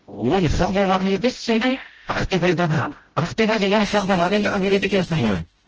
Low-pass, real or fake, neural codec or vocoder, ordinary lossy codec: 7.2 kHz; fake; codec, 16 kHz, 0.5 kbps, FreqCodec, smaller model; Opus, 16 kbps